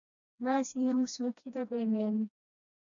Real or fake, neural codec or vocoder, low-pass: fake; codec, 16 kHz, 1 kbps, FreqCodec, smaller model; 7.2 kHz